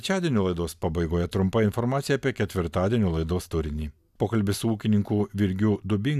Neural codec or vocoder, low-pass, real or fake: none; 14.4 kHz; real